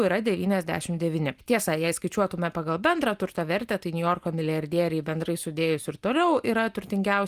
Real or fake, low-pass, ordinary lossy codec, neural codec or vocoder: real; 14.4 kHz; Opus, 32 kbps; none